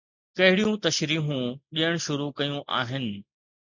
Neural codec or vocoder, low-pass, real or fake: none; 7.2 kHz; real